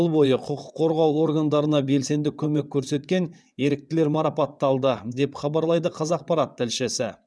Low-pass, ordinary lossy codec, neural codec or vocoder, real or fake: none; none; vocoder, 22.05 kHz, 80 mel bands, WaveNeXt; fake